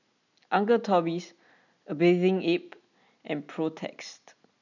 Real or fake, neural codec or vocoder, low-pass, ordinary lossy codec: real; none; 7.2 kHz; none